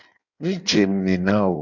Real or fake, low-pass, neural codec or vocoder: fake; 7.2 kHz; codec, 16 kHz in and 24 kHz out, 1.1 kbps, FireRedTTS-2 codec